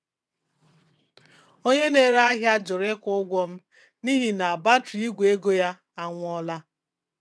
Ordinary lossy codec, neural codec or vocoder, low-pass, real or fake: none; vocoder, 22.05 kHz, 80 mel bands, WaveNeXt; none; fake